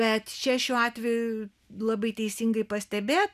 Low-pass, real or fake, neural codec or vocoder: 14.4 kHz; real; none